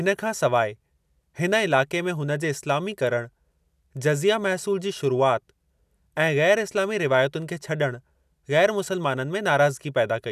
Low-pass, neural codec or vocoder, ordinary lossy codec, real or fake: 14.4 kHz; none; none; real